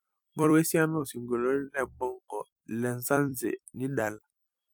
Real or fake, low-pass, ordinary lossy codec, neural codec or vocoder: fake; none; none; vocoder, 44.1 kHz, 128 mel bands every 256 samples, BigVGAN v2